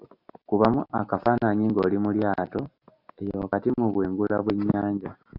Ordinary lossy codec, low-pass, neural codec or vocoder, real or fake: Opus, 64 kbps; 5.4 kHz; none; real